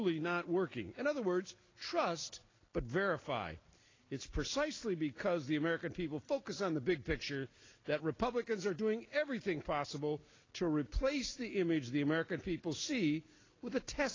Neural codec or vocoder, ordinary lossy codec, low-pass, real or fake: none; AAC, 32 kbps; 7.2 kHz; real